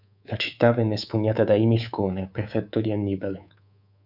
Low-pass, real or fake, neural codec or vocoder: 5.4 kHz; fake; codec, 24 kHz, 3.1 kbps, DualCodec